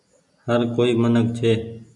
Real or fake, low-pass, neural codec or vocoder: real; 10.8 kHz; none